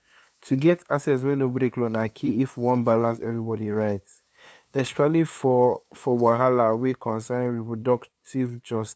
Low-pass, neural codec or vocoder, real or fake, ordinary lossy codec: none; codec, 16 kHz, 2 kbps, FunCodec, trained on LibriTTS, 25 frames a second; fake; none